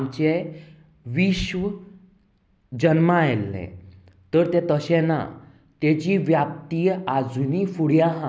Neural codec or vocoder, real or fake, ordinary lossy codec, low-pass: none; real; none; none